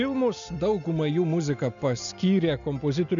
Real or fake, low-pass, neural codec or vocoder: real; 7.2 kHz; none